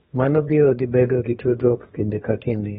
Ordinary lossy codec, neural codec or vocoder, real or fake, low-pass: AAC, 16 kbps; codec, 32 kHz, 1.9 kbps, SNAC; fake; 14.4 kHz